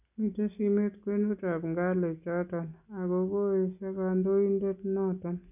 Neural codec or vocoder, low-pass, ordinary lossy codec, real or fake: none; 3.6 kHz; none; real